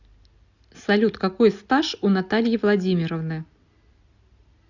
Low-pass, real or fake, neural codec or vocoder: 7.2 kHz; real; none